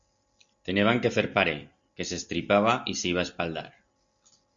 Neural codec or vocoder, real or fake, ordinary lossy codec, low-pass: none; real; Opus, 64 kbps; 7.2 kHz